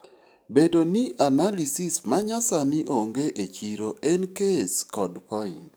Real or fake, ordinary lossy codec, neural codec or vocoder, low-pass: fake; none; codec, 44.1 kHz, 7.8 kbps, DAC; none